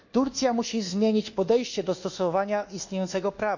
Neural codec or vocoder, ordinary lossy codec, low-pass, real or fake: codec, 24 kHz, 1.2 kbps, DualCodec; none; 7.2 kHz; fake